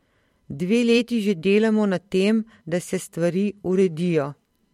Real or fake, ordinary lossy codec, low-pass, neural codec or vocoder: fake; MP3, 64 kbps; 19.8 kHz; vocoder, 44.1 kHz, 128 mel bands every 512 samples, BigVGAN v2